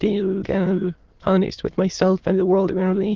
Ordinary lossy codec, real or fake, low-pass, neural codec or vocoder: Opus, 16 kbps; fake; 7.2 kHz; autoencoder, 22.05 kHz, a latent of 192 numbers a frame, VITS, trained on many speakers